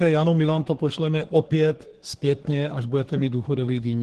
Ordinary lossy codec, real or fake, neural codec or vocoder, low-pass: Opus, 16 kbps; fake; codec, 24 kHz, 1 kbps, SNAC; 10.8 kHz